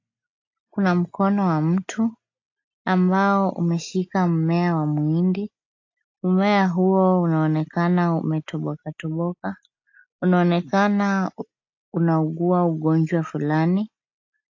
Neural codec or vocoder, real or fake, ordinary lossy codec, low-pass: none; real; AAC, 48 kbps; 7.2 kHz